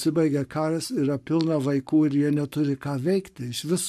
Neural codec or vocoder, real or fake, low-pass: codec, 44.1 kHz, 7.8 kbps, Pupu-Codec; fake; 14.4 kHz